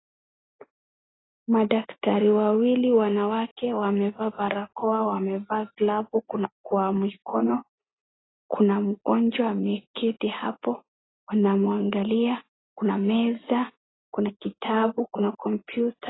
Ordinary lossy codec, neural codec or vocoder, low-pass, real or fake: AAC, 16 kbps; none; 7.2 kHz; real